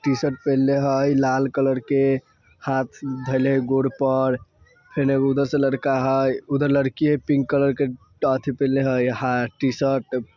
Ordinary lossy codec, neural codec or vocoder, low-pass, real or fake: none; none; 7.2 kHz; real